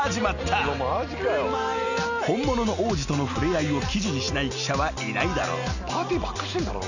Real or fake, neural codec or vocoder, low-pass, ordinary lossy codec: real; none; 7.2 kHz; none